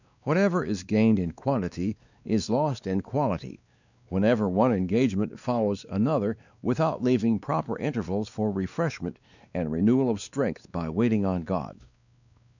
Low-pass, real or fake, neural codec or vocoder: 7.2 kHz; fake; codec, 16 kHz, 2 kbps, X-Codec, WavLM features, trained on Multilingual LibriSpeech